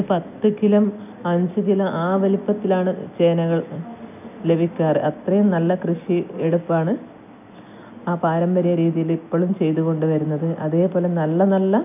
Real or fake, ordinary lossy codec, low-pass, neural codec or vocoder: real; none; 3.6 kHz; none